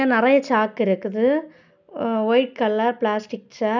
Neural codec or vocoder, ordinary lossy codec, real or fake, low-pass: none; none; real; 7.2 kHz